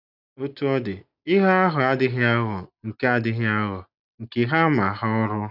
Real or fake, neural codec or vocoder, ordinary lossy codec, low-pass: real; none; none; 5.4 kHz